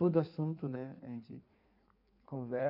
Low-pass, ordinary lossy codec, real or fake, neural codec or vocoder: 5.4 kHz; none; fake; codec, 16 kHz in and 24 kHz out, 1.1 kbps, FireRedTTS-2 codec